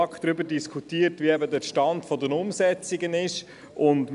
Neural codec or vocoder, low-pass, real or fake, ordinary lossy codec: none; 10.8 kHz; real; none